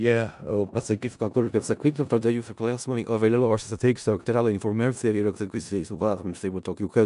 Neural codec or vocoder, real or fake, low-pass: codec, 16 kHz in and 24 kHz out, 0.4 kbps, LongCat-Audio-Codec, four codebook decoder; fake; 10.8 kHz